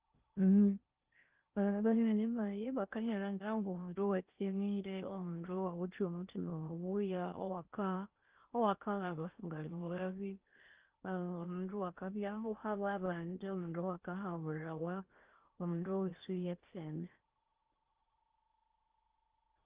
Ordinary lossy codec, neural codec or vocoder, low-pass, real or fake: Opus, 32 kbps; codec, 16 kHz in and 24 kHz out, 0.8 kbps, FocalCodec, streaming, 65536 codes; 3.6 kHz; fake